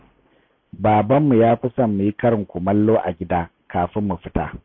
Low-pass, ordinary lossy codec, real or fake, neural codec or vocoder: 7.2 kHz; MP3, 32 kbps; real; none